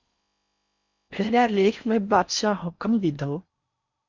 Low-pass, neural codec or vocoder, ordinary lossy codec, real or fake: 7.2 kHz; codec, 16 kHz in and 24 kHz out, 0.6 kbps, FocalCodec, streaming, 4096 codes; Opus, 64 kbps; fake